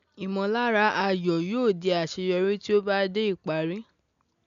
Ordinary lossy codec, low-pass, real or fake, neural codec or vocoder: none; 7.2 kHz; real; none